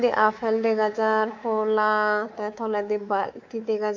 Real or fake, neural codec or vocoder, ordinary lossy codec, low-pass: fake; codec, 24 kHz, 3.1 kbps, DualCodec; AAC, 48 kbps; 7.2 kHz